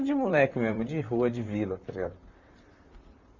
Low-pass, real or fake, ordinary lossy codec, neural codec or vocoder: 7.2 kHz; fake; none; vocoder, 44.1 kHz, 128 mel bands, Pupu-Vocoder